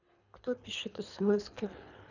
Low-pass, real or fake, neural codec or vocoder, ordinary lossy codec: 7.2 kHz; fake; codec, 24 kHz, 3 kbps, HILCodec; none